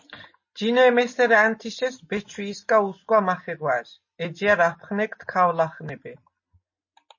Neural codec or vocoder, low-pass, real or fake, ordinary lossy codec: none; 7.2 kHz; real; MP3, 32 kbps